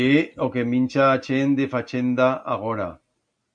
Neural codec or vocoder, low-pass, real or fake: none; 9.9 kHz; real